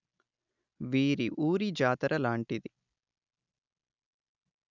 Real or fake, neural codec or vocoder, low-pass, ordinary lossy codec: real; none; 7.2 kHz; none